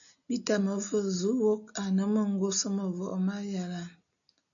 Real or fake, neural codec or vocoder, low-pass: real; none; 7.2 kHz